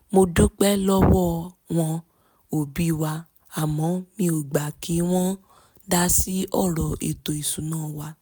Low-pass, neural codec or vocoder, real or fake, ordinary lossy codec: none; none; real; none